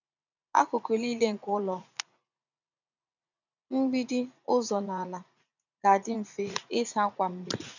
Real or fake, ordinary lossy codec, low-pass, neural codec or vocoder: fake; none; 7.2 kHz; vocoder, 44.1 kHz, 80 mel bands, Vocos